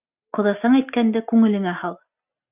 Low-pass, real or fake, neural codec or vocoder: 3.6 kHz; real; none